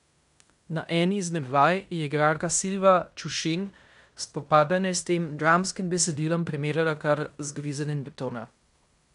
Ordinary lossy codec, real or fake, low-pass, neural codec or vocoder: none; fake; 10.8 kHz; codec, 16 kHz in and 24 kHz out, 0.9 kbps, LongCat-Audio-Codec, fine tuned four codebook decoder